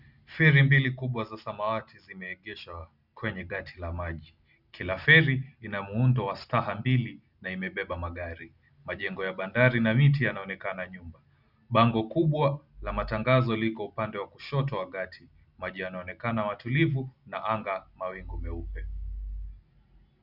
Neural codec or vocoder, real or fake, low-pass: none; real; 5.4 kHz